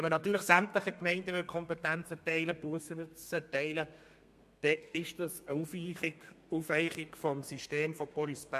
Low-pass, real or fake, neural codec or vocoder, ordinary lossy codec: 14.4 kHz; fake; codec, 32 kHz, 1.9 kbps, SNAC; MP3, 64 kbps